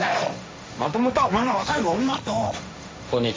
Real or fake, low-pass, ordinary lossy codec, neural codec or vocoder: fake; none; none; codec, 16 kHz, 1.1 kbps, Voila-Tokenizer